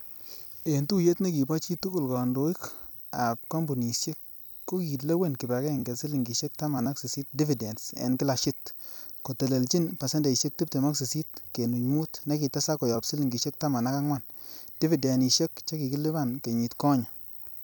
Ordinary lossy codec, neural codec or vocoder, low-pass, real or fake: none; vocoder, 44.1 kHz, 128 mel bands every 256 samples, BigVGAN v2; none; fake